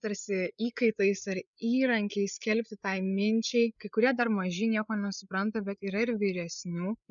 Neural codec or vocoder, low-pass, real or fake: codec, 16 kHz, 8 kbps, FreqCodec, larger model; 7.2 kHz; fake